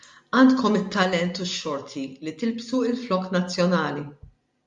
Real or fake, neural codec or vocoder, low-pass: real; none; 10.8 kHz